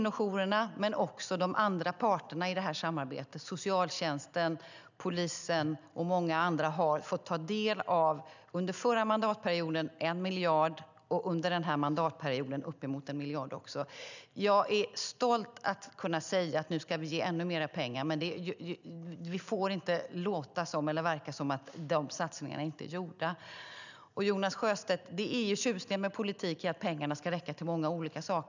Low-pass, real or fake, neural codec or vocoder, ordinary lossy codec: 7.2 kHz; real; none; none